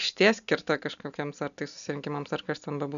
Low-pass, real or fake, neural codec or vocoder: 7.2 kHz; real; none